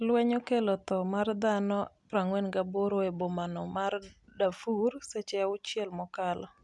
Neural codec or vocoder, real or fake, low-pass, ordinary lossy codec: none; real; 10.8 kHz; none